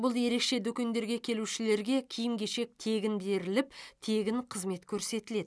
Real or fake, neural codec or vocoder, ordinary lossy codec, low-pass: real; none; none; none